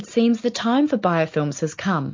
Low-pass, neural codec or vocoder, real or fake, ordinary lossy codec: 7.2 kHz; none; real; MP3, 48 kbps